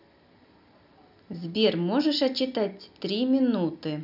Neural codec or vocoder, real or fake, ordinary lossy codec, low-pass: none; real; none; 5.4 kHz